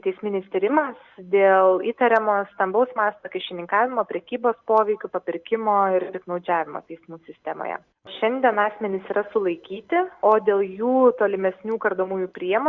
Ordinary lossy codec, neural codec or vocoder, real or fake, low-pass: Opus, 64 kbps; none; real; 7.2 kHz